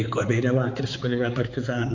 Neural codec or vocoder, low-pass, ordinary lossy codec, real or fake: codec, 16 kHz, 4 kbps, X-Codec, HuBERT features, trained on balanced general audio; 7.2 kHz; AAC, 48 kbps; fake